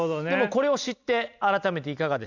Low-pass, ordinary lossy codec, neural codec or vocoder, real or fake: 7.2 kHz; none; none; real